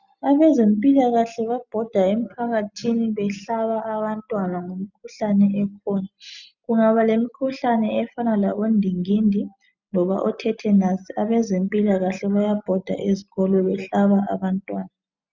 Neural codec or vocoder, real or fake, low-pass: none; real; 7.2 kHz